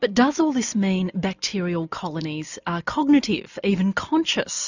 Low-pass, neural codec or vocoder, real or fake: 7.2 kHz; none; real